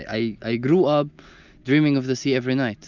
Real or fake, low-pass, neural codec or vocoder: real; 7.2 kHz; none